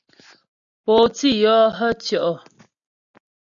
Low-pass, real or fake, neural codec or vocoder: 7.2 kHz; real; none